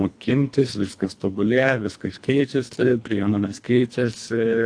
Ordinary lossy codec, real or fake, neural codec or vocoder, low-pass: AAC, 64 kbps; fake; codec, 24 kHz, 1.5 kbps, HILCodec; 9.9 kHz